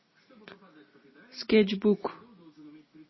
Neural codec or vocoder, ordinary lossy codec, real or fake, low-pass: none; MP3, 24 kbps; real; 7.2 kHz